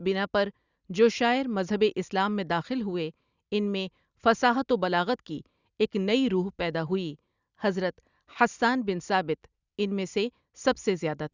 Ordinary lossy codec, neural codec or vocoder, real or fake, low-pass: Opus, 64 kbps; none; real; 7.2 kHz